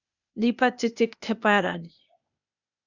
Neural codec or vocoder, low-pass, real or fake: codec, 16 kHz, 0.8 kbps, ZipCodec; 7.2 kHz; fake